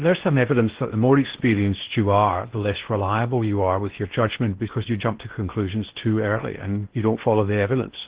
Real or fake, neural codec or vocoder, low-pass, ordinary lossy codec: fake; codec, 16 kHz in and 24 kHz out, 0.6 kbps, FocalCodec, streaming, 4096 codes; 3.6 kHz; Opus, 16 kbps